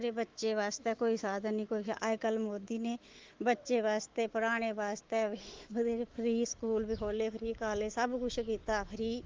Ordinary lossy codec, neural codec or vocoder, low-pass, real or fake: Opus, 16 kbps; autoencoder, 48 kHz, 128 numbers a frame, DAC-VAE, trained on Japanese speech; 7.2 kHz; fake